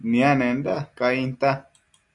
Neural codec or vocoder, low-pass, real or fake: none; 10.8 kHz; real